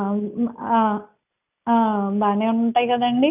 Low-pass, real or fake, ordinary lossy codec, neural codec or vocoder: 3.6 kHz; real; none; none